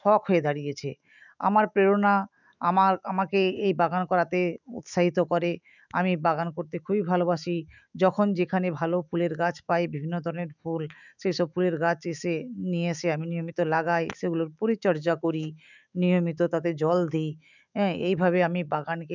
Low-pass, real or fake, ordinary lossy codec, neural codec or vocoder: 7.2 kHz; fake; none; autoencoder, 48 kHz, 128 numbers a frame, DAC-VAE, trained on Japanese speech